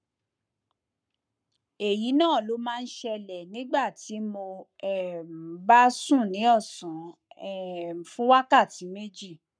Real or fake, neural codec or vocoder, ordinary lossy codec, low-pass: fake; codec, 44.1 kHz, 7.8 kbps, Pupu-Codec; none; 9.9 kHz